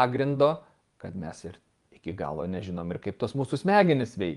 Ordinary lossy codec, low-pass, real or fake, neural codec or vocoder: Opus, 32 kbps; 10.8 kHz; fake; vocoder, 24 kHz, 100 mel bands, Vocos